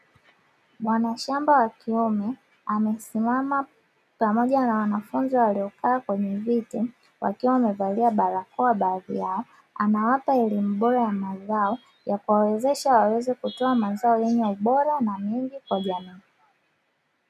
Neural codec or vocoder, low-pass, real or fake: none; 14.4 kHz; real